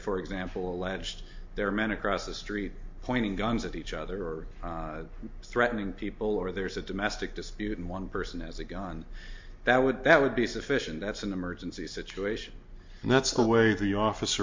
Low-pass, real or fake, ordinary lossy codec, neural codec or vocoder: 7.2 kHz; real; MP3, 48 kbps; none